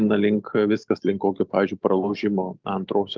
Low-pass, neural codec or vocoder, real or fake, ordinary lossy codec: 7.2 kHz; none; real; Opus, 32 kbps